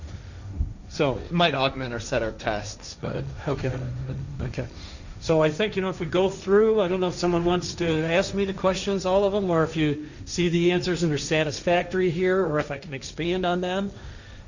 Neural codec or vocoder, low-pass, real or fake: codec, 16 kHz, 1.1 kbps, Voila-Tokenizer; 7.2 kHz; fake